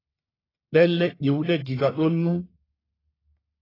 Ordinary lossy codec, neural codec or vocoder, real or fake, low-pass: AAC, 24 kbps; codec, 44.1 kHz, 1.7 kbps, Pupu-Codec; fake; 5.4 kHz